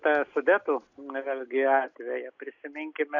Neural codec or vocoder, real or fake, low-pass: none; real; 7.2 kHz